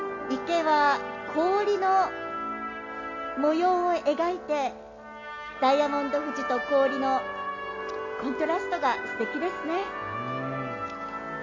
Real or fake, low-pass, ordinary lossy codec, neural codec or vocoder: real; 7.2 kHz; none; none